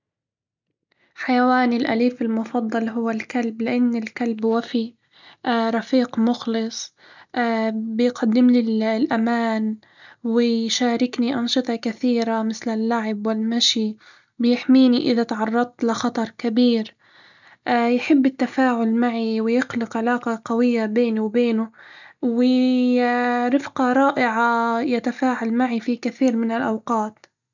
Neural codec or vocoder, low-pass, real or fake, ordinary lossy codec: none; 7.2 kHz; real; none